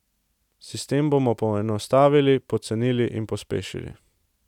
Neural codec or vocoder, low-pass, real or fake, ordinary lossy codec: none; 19.8 kHz; real; none